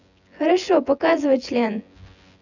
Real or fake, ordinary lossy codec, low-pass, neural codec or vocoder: fake; none; 7.2 kHz; vocoder, 24 kHz, 100 mel bands, Vocos